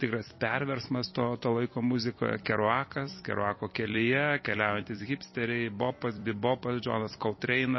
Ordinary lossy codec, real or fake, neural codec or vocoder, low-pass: MP3, 24 kbps; fake; vocoder, 44.1 kHz, 128 mel bands every 256 samples, BigVGAN v2; 7.2 kHz